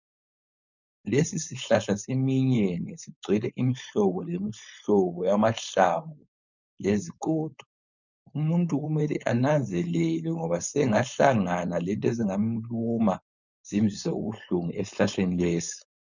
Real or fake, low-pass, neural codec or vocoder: fake; 7.2 kHz; codec, 16 kHz, 4.8 kbps, FACodec